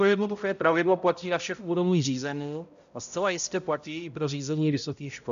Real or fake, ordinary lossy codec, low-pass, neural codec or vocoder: fake; AAC, 96 kbps; 7.2 kHz; codec, 16 kHz, 0.5 kbps, X-Codec, HuBERT features, trained on balanced general audio